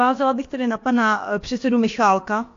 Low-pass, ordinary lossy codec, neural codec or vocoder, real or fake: 7.2 kHz; AAC, 48 kbps; codec, 16 kHz, about 1 kbps, DyCAST, with the encoder's durations; fake